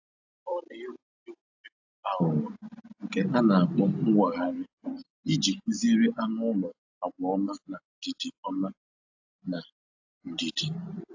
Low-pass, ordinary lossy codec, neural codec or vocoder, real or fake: 7.2 kHz; AAC, 48 kbps; none; real